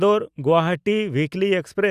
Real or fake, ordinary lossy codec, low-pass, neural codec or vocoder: real; Opus, 64 kbps; 14.4 kHz; none